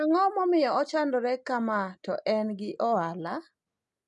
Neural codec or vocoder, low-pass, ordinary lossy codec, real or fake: none; 10.8 kHz; none; real